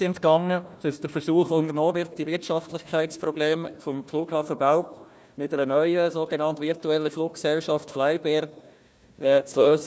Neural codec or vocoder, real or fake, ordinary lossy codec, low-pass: codec, 16 kHz, 1 kbps, FunCodec, trained on Chinese and English, 50 frames a second; fake; none; none